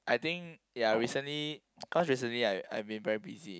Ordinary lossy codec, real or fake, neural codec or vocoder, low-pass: none; real; none; none